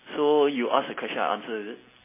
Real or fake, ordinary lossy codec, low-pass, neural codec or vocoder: real; MP3, 16 kbps; 3.6 kHz; none